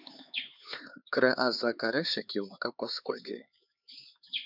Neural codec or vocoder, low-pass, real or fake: codec, 16 kHz, 4 kbps, X-Codec, HuBERT features, trained on LibriSpeech; 5.4 kHz; fake